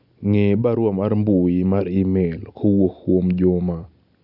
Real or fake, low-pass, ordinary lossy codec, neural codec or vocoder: real; 5.4 kHz; none; none